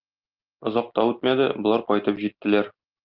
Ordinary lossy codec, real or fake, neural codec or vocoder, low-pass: Opus, 32 kbps; real; none; 5.4 kHz